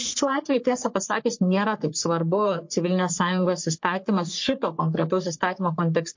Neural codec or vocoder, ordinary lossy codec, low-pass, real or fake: codec, 44.1 kHz, 3.4 kbps, Pupu-Codec; MP3, 48 kbps; 7.2 kHz; fake